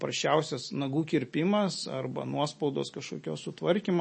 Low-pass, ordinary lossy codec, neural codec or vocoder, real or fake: 9.9 kHz; MP3, 32 kbps; none; real